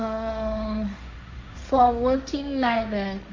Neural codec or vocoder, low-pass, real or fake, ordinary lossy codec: codec, 16 kHz, 1.1 kbps, Voila-Tokenizer; 7.2 kHz; fake; MP3, 48 kbps